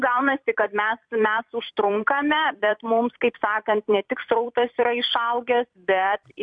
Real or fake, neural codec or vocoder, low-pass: fake; vocoder, 44.1 kHz, 128 mel bands every 256 samples, BigVGAN v2; 9.9 kHz